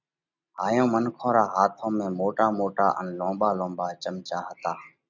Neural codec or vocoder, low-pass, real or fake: none; 7.2 kHz; real